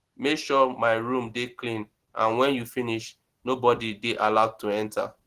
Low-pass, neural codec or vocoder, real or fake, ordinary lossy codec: 14.4 kHz; none; real; Opus, 16 kbps